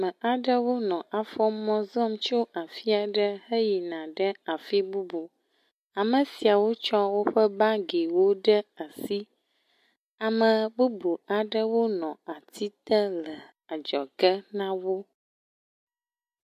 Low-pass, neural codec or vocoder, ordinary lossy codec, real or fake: 14.4 kHz; none; MP3, 64 kbps; real